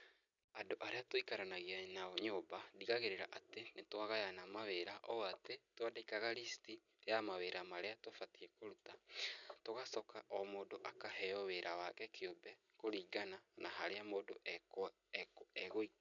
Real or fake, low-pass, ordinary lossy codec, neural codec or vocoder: real; 7.2 kHz; none; none